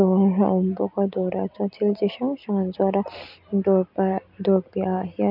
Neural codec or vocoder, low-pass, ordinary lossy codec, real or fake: none; 5.4 kHz; none; real